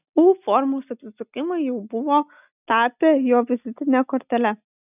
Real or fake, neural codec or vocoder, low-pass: real; none; 3.6 kHz